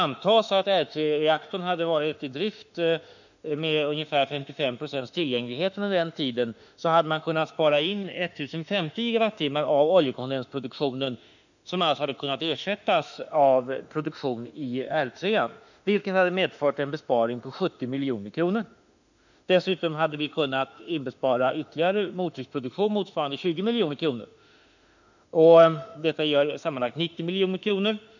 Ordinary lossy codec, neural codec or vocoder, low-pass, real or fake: none; autoencoder, 48 kHz, 32 numbers a frame, DAC-VAE, trained on Japanese speech; 7.2 kHz; fake